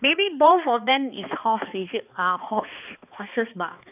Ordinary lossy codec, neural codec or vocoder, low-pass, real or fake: none; codec, 16 kHz, 2 kbps, X-Codec, HuBERT features, trained on balanced general audio; 3.6 kHz; fake